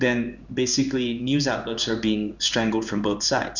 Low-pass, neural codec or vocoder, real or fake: 7.2 kHz; codec, 16 kHz in and 24 kHz out, 1 kbps, XY-Tokenizer; fake